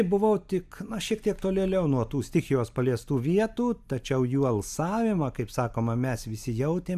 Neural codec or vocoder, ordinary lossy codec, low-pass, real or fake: none; AAC, 96 kbps; 14.4 kHz; real